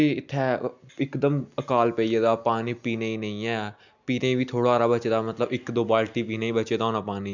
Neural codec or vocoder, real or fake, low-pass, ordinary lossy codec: none; real; 7.2 kHz; none